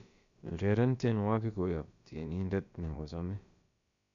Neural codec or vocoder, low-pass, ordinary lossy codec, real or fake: codec, 16 kHz, about 1 kbps, DyCAST, with the encoder's durations; 7.2 kHz; none; fake